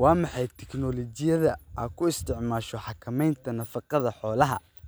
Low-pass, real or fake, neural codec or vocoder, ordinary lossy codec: none; real; none; none